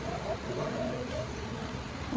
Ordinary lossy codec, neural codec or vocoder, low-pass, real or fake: none; codec, 16 kHz, 8 kbps, FreqCodec, larger model; none; fake